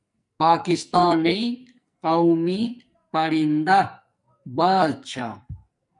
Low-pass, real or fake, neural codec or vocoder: 10.8 kHz; fake; codec, 44.1 kHz, 2.6 kbps, SNAC